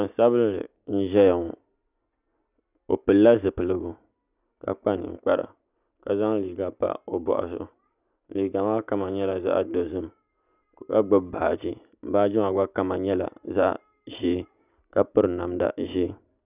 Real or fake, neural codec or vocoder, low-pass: real; none; 3.6 kHz